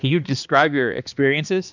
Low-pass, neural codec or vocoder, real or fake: 7.2 kHz; codec, 16 kHz, 2 kbps, X-Codec, HuBERT features, trained on balanced general audio; fake